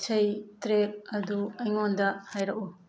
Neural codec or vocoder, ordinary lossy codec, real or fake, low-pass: none; none; real; none